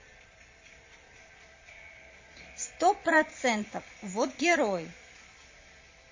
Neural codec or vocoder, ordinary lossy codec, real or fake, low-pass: vocoder, 22.05 kHz, 80 mel bands, Vocos; MP3, 32 kbps; fake; 7.2 kHz